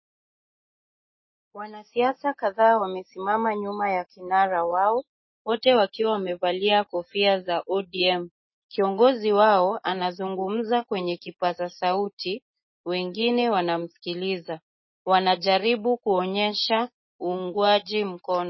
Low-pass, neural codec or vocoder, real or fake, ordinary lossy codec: 7.2 kHz; none; real; MP3, 24 kbps